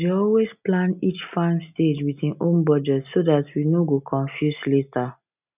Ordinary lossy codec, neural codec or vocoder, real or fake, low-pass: none; none; real; 3.6 kHz